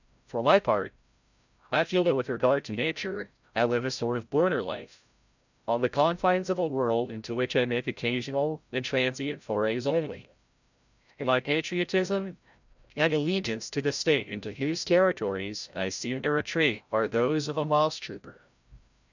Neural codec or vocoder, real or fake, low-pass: codec, 16 kHz, 0.5 kbps, FreqCodec, larger model; fake; 7.2 kHz